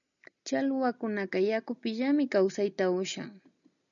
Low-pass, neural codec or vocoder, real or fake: 7.2 kHz; none; real